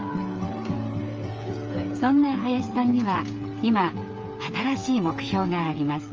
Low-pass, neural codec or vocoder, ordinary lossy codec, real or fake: 7.2 kHz; codec, 16 kHz, 8 kbps, FreqCodec, smaller model; Opus, 24 kbps; fake